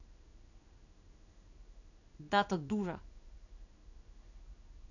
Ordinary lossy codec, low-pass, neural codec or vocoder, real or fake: none; 7.2 kHz; codec, 16 kHz in and 24 kHz out, 1 kbps, XY-Tokenizer; fake